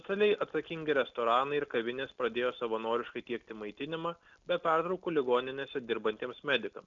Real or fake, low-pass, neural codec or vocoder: real; 7.2 kHz; none